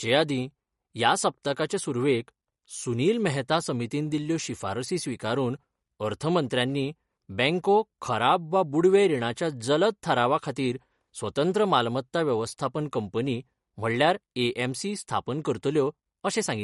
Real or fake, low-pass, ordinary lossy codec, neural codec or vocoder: real; 14.4 kHz; MP3, 48 kbps; none